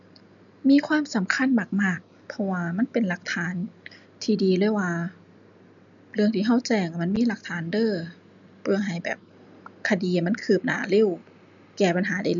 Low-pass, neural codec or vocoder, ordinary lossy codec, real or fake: 7.2 kHz; none; none; real